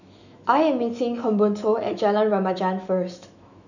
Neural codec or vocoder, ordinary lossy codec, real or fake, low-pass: autoencoder, 48 kHz, 128 numbers a frame, DAC-VAE, trained on Japanese speech; none; fake; 7.2 kHz